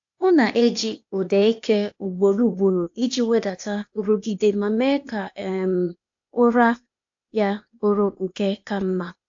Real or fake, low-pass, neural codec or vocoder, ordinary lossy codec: fake; 7.2 kHz; codec, 16 kHz, 0.8 kbps, ZipCodec; AAC, 64 kbps